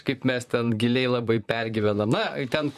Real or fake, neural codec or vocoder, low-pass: fake; vocoder, 44.1 kHz, 128 mel bands, Pupu-Vocoder; 14.4 kHz